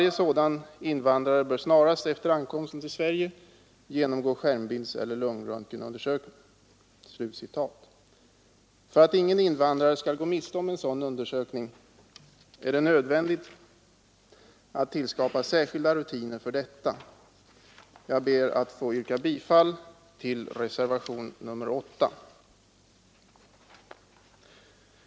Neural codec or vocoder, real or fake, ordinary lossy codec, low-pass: none; real; none; none